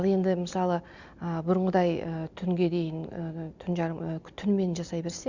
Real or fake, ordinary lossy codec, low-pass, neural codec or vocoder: real; Opus, 64 kbps; 7.2 kHz; none